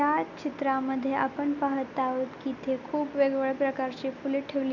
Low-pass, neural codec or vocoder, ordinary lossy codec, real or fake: 7.2 kHz; none; none; real